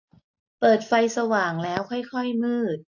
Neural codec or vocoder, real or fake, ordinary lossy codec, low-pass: none; real; none; 7.2 kHz